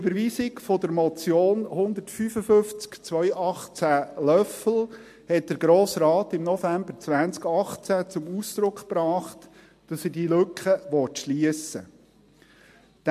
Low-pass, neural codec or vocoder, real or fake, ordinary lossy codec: 14.4 kHz; none; real; MP3, 64 kbps